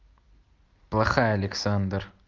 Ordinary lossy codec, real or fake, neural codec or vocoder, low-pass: Opus, 32 kbps; real; none; 7.2 kHz